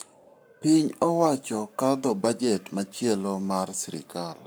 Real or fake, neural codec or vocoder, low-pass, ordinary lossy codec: fake; codec, 44.1 kHz, 7.8 kbps, Pupu-Codec; none; none